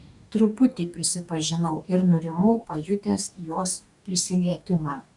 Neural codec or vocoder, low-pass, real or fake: codec, 44.1 kHz, 2.6 kbps, DAC; 10.8 kHz; fake